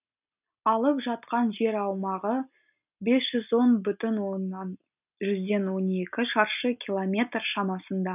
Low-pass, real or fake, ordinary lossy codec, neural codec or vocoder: 3.6 kHz; real; none; none